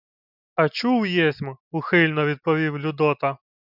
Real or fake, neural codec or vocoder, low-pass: real; none; 5.4 kHz